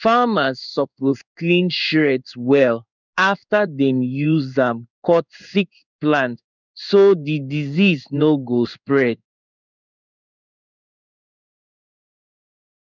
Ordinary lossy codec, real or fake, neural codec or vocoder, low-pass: none; fake; codec, 16 kHz in and 24 kHz out, 1 kbps, XY-Tokenizer; 7.2 kHz